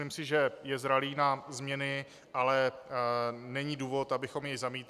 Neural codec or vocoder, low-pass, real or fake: none; 14.4 kHz; real